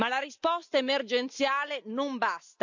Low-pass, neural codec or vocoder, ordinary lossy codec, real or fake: 7.2 kHz; none; none; real